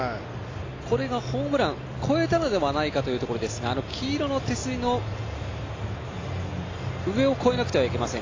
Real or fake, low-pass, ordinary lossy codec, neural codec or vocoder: real; 7.2 kHz; AAC, 32 kbps; none